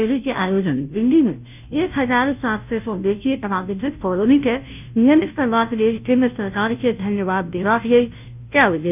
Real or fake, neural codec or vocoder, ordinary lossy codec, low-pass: fake; codec, 16 kHz, 0.5 kbps, FunCodec, trained on Chinese and English, 25 frames a second; none; 3.6 kHz